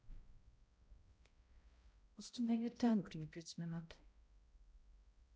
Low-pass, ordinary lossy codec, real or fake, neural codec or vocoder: none; none; fake; codec, 16 kHz, 0.5 kbps, X-Codec, HuBERT features, trained on balanced general audio